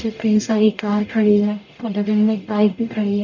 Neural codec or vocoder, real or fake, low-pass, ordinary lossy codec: codec, 44.1 kHz, 0.9 kbps, DAC; fake; 7.2 kHz; none